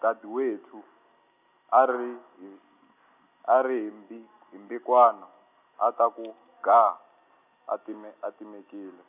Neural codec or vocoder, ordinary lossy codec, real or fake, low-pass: none; none; real; 3.6 kHz